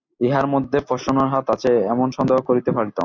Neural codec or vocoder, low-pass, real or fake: none; 7.2 kHz; real